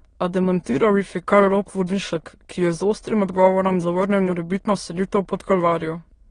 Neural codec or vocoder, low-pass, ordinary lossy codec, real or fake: autoencoder, 22.05 kHz, a latent of 192 numbers a frame, VITS, trained on many speakers; 9.9 kHz; AAC, 32 kbps; fake